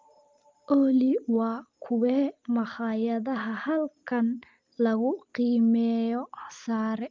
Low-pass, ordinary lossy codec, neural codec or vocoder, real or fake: 7.2 kHz; Opus, 24 kbps; none; real